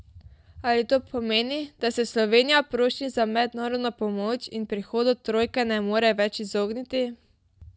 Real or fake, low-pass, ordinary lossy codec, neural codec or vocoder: real; none; none; none